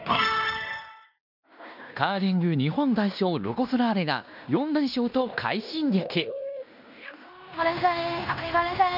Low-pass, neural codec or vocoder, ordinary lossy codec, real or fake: 5.4 kHz; codec, 16 kHz in and 24 kHz out, 0.9 kbps, LongCat-Audio-Codec, fine tuned four codebook decoder; none; fake